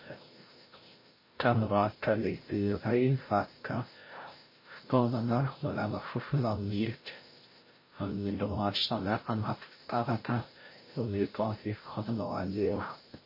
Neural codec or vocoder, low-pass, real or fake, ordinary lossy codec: codec, 16 kHz, 0.5 kbps, FreqCodec, larger model; 5.4 kHz; fake; MP3, 24 kbps